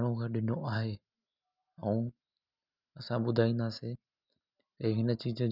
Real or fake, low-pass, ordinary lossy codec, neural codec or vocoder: real; 5.4 kHz; none; none